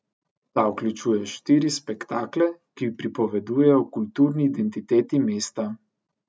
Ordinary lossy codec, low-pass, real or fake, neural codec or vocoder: none; none; real; none